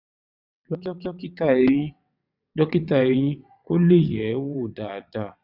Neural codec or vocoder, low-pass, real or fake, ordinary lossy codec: vocoder, 22.05 kHz, 80 mel bands, WaveNeXt; 5.4 kHz; fake; none